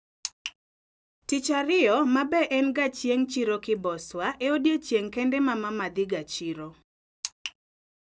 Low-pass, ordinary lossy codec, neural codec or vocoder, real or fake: none; none; none; real